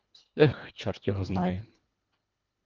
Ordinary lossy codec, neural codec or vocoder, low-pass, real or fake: Opus, 24 kbps; codec, 24 kHz, 1.5 kbps, HILCodec; 7.2 kHz; fake